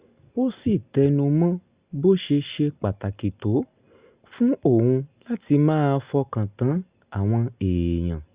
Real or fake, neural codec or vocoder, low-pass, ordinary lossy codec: real; none; 3.6 kHz; Opus, 64 kbps